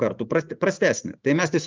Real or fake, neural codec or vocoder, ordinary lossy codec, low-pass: fake; vocoder, 44.1 kHz, 128 mel bands every 512 samples, BigVGAN v2; Opus, 32 kbps; 7.2 kHz